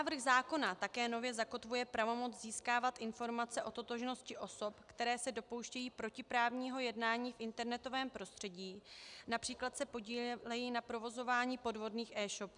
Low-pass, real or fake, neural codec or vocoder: 10.8 kHz; real; none